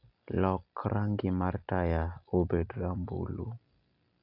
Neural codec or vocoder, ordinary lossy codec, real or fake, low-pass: none; AAC, 48 kbps; real; 5.4 kHz